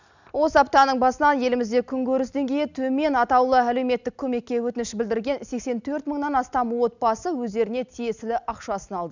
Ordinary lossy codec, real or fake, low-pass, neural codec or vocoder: none; real; 7.2 kHz; none